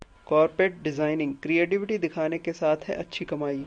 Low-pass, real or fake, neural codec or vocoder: 9.9 kHz; real; none